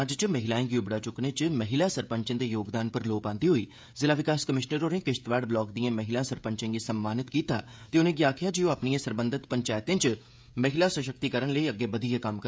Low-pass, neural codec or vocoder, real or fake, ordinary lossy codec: none; codec, 16 kHz, 16 kbps, FreqCodec, smaller model; fake; none